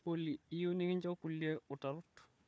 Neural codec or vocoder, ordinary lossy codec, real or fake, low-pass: codec, 16 kHz, 4 kbps, FunCodec, trained on Chinese and English, 50 frames a second; none; fake; none